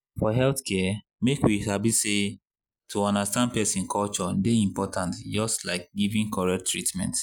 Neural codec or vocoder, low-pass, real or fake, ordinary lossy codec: none; 19.8 kHz; real; none